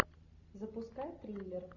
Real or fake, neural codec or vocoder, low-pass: real; none; 7.2 kHz